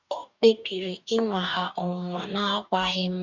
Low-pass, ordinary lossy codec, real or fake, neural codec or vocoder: 7.2 kHz; none; fake; codec, 44.1 kHz, 2.6 kbps, DAC